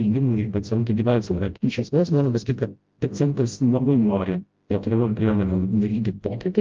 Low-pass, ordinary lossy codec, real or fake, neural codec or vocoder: 7.2 kHz; Opus, 24 kbps; fake; codec, 16 kHz, 0.5 kbps, FreqCodec, smaller model